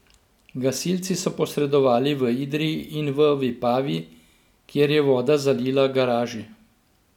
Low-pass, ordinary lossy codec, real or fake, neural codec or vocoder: 19.8 kHz; none; real; none